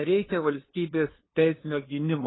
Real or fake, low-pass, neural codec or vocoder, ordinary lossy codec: fake; 7.2 kHz; codec, 16 kHz, 2 kbps, FunCodec, trained on LibriTTS, 25 frames a second; AAC, 16 kbps